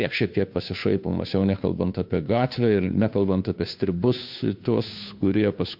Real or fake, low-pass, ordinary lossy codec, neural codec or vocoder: fake; 5.4 kHz; MP3, 48 kbps; codec, 16 kHz, 2 kbps, FunCodec, trained on Chinese and English, 25 frames a second